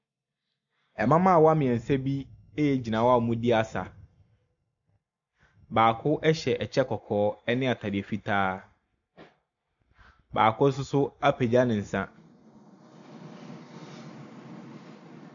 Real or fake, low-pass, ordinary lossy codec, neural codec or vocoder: real; 7.2 kHz; MP3, 96 kbps; none